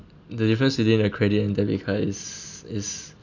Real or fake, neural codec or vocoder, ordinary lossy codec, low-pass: real; none; none; 7.2 kHz